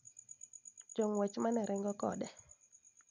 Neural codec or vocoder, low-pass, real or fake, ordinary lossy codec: none; 7.2 kHz; real; none